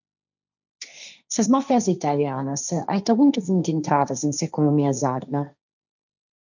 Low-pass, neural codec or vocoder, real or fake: 7.2 kHz; codec, 16 kHz, 1.1 kbps, Voila-Tokenizer; fake